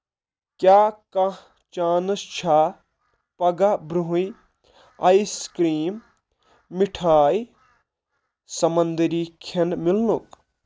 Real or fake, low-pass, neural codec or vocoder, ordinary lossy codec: real; none; none; none